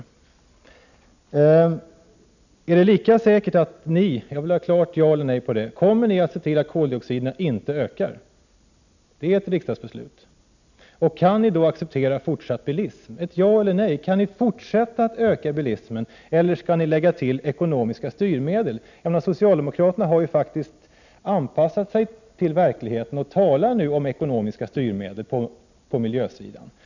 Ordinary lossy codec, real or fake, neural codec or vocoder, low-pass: none; real; none; 7.2 kHz